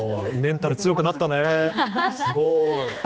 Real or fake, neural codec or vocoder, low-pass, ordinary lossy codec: fake; codec, 16 kHz, 2 kbps, X-Codec, HuBERT features, trained on general audio; none; none